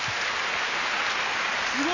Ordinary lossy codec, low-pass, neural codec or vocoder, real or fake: none; 7.2 kHz; none; real